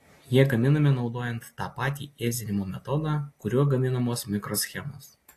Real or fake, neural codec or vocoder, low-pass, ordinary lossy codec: real; none; 14.4 kHz; AAC, 48 kbps